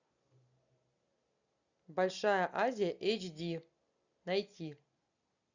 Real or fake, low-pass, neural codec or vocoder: real; 7.2 kHz; none